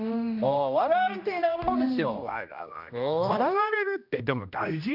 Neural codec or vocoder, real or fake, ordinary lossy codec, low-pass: codec, 16 kHz, 1 kbps, X-Codec, HuBERT features, trained on general audio; fake; none; 5.4 kHz